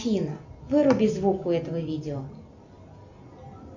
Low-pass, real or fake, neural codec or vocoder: 7.2 kHz; real; none